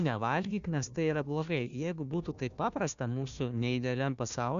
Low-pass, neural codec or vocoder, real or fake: 7.2 kHz; codec, 16 kHz, 1 kbps, FunCodec, trained on Chinese and English, 50 frames a second; fake